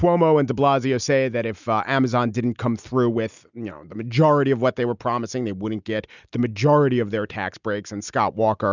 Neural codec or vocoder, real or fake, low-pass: none; real; 7.2 kHz